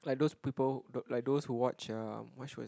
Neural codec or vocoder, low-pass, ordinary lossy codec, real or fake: none; none; none; real